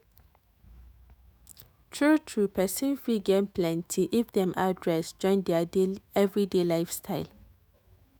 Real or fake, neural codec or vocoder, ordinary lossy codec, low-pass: fake; autoencoder, 48 kHz, 128 numbers a frame, DAC-VAE, trained on Japanese speech; none; none